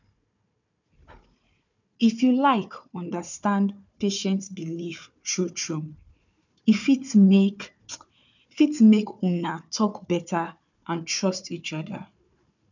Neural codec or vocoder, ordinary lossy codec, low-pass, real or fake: codec, 16 kHz, 4 kbps, FunCodec, trained on Chinese and English, 50 frames a second; none; 7.2 kHz; fake